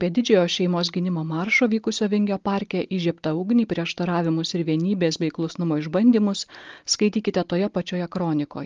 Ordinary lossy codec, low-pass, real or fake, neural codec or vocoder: Opus, 32 kbps; 7.2 kHz; real; none